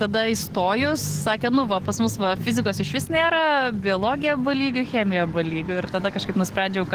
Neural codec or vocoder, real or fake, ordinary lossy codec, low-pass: codec, 44.1 kHz, 7.8 kbps, DAC; fake; Opus, 16 kbps; 14.4 kHz